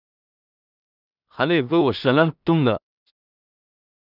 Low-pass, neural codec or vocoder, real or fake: 5.4 kHz; codec, 16 kHz in and 24 kHz out, 0.4 kbps, LongCat-Audio-Codec, two codebook decoder; fake